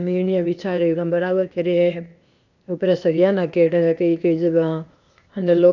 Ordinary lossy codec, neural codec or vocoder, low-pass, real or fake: none; codec, 16 kHz, 0.8 kbps, ZipCodec; 7.2 kHz; fake